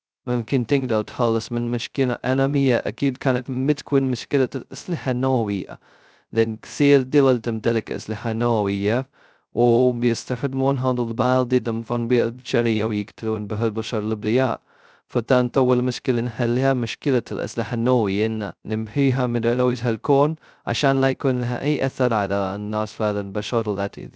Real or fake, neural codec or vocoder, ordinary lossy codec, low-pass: fake; codec, 16 kHz, 0.2 kbps, FocalCodec; none; none